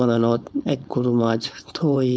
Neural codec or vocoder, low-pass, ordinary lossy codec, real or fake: codec, 16 kHz, 4.8 kbps, FACodec; none; none; fake